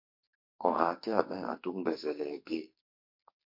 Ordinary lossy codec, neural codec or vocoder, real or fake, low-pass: MP3, 32 kbps; codec, 44.1 kHz, 2.6 kbps, SNAC; fake; 5.4 kHz